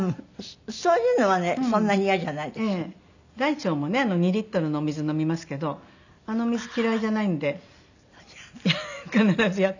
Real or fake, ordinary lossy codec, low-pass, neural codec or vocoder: real; none; 7.2 kHz; none